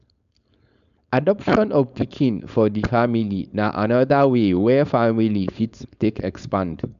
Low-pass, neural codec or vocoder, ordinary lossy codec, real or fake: 7.2 kHz; codec, 16 kHz, 4.8 kbps, FACodec; none; fake